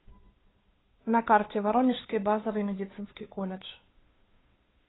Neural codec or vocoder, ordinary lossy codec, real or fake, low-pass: codec, 16 kHz, 2 kbps, FunCodec, trained on Chinese and English, 25 frames a second; AAC, 16 kbps; fake; 7.2 kHz